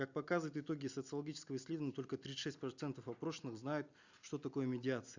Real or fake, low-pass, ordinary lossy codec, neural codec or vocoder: real; 7.2 kHz; Opus, 64 kbps; none